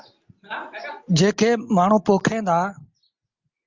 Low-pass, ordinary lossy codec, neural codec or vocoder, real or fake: 7.2 kHz; Opus, 32 kbps; none; real